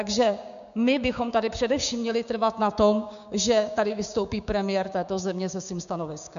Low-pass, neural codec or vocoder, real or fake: 7.2 kHz; codec, 16 kHz, 6 kbps, DAC; fake